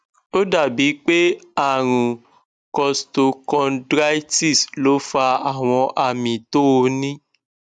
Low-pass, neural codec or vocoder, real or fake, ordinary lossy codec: 9.9 kHz; none; real; none